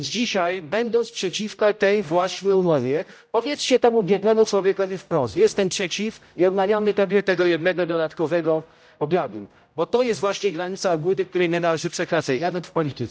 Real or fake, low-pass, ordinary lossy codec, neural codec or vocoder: fake; none; none; codec, 16 kHz, 0.5 kbps, X-Codec, HuBERT features, trained on general audio